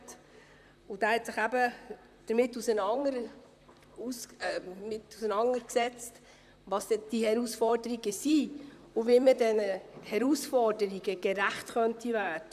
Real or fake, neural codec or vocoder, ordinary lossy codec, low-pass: fake; vocoder, 44.1 kHz, 128 mel bands, Pupu-Vocoder; none; 14.4 kHz